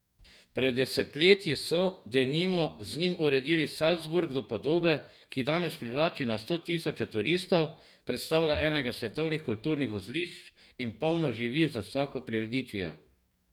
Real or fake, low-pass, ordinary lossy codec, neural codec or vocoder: fake; 19.8 kHz; none; codec, 44.1 kHz, 2.6 kbps, DAC